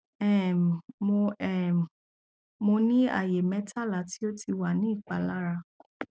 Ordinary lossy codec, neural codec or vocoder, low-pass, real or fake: none; none; none; real